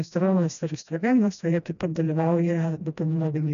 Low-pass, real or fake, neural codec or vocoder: 7.2 kHz; fake; codec, 16 kHz, 1 kbps, FreqCodec, smaller model